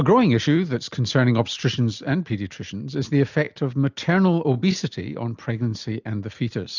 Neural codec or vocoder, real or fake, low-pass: none; real; 7.2 kHz